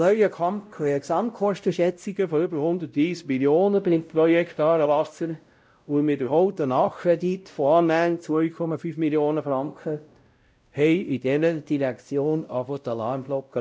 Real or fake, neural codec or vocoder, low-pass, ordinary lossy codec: fake; codec, 16 kHz, 0.5 kbps, X-Codec, WavLM features, trained on Multilingual LibriSpeech; none; none